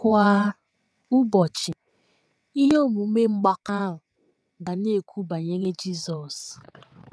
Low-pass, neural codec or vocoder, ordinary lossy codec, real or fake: none; vocoder, 22.05 kHz, 80 mel bands, WaveNeXt; none; fake